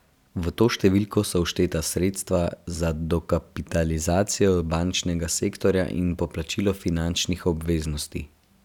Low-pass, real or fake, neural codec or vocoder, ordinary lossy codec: 19.8 kHz; real; none; none